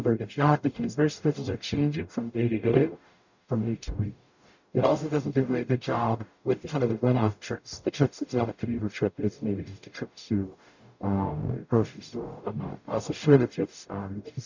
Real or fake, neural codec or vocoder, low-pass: fake; codec, 44.1 kHz, 0.9 kbps, DAC; 7.2 kHz